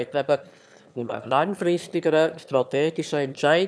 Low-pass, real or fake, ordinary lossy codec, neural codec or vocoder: none; fake; none; autoencoder, 22.05 kHz, a latent of 192 numbers a frame, VITS, trained on one speaker